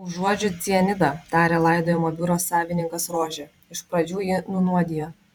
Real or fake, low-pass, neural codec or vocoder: fake; 19.8 kHz; vocoder, 44.1 kHz, 128 mel bands every 512 samples, BigVGAN v2